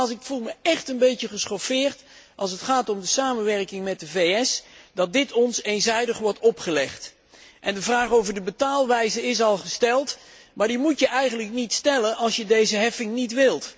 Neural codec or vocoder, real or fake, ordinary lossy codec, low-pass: none; real; none; none